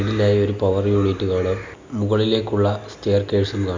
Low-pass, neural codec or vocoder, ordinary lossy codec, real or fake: 7.2 kHz; none; MP3, 64 kbps; real